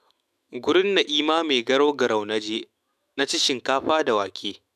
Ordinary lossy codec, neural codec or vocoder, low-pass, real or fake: none; autoencoder, 48 kHz, 128 numbers a frame, DAC-VAE, trained on Japanese speech; 14.4 kHz; fake